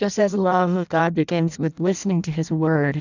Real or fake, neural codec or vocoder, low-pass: fake; codec, 16 kHz in and 24 kHz out, 0.6 kbps, FireRedTTS-2 codec; 7.2 kHz